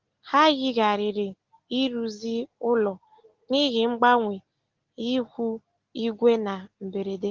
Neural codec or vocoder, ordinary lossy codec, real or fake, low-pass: none; Opus, 16 kbps; real; 7.2 kHz